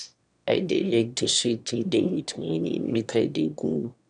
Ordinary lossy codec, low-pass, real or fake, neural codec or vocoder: none; 9.9 kHz; fake; autoencoder, 22.05 kHz, a latent of 192 numbers a frame, VITS, trained on one speaker